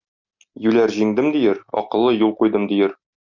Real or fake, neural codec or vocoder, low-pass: real; none; 7.2 kHz